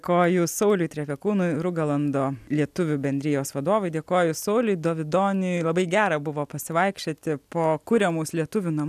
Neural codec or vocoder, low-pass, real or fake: none; 14.4 kHz; real